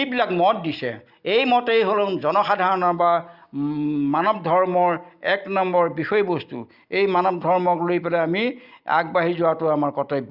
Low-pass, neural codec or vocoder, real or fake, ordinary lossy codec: 5.4 kHz; none; real; Opus, 64 kbps